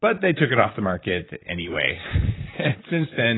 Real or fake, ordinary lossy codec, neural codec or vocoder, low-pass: fake; AAC, 16 kbps; vocoder, 44.1 kHz, 128 mel bands, Pupu-Vocoder; 7.2 kHz